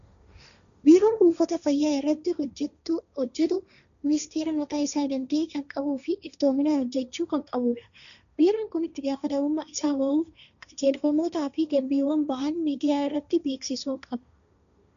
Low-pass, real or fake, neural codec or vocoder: 7.2 kHz; fake; codec, 16 kHz, 1.1 kbps, Voila-Tokenizer